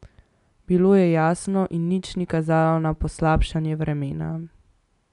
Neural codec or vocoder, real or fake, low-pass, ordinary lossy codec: none; real; 10.8 kHz; none